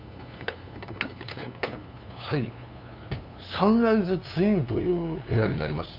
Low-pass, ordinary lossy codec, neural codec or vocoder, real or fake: 5.4 kHz; none; codec, 16 kHz, 2 kbps, FunCodec, trained on LibriTTS, 25 frames a second; fake